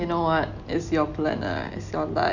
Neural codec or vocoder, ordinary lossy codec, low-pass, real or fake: none; none; 7.2 kHz; real